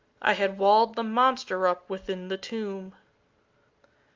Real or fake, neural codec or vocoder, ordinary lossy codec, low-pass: real; none; Opus, 32 kbps; 7.2 kHz